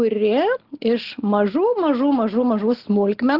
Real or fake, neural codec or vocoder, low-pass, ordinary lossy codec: real; none; 5.4 kHz; Opus, 16 kbps